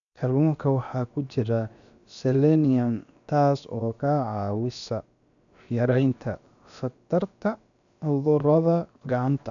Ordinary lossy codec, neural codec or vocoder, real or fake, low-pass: none; codec, 16 kHz, about 1 kbps, DyCAST, with the encoder's durations; fake; 7.2 kHz